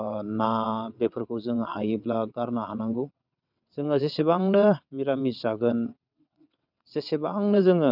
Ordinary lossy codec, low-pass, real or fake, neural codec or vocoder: none; 5.4 kHz; fake; vocoder, 22.05 kHz, 80 mel bands, WaveNeXt